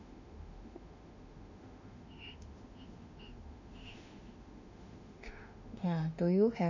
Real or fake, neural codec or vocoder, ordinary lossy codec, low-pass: fake; autoencoder, 48 kHz, 32 numbers a frame, DAC-VAE, trained on Japanese speech; none; 7.2 kHz